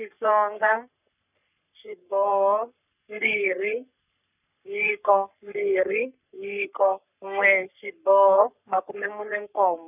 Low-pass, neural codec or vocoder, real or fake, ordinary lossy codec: 3.6 kHz; codec, 44.1 kHz, 3.4 kbps, Pupu-Codec; fake; none